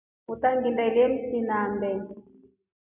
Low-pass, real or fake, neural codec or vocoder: 3.6 kHz; real; none